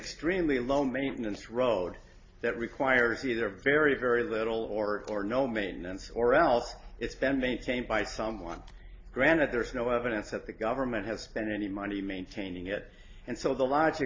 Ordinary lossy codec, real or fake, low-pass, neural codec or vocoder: AAC, 32 kbps; real; 7.2 kHz; none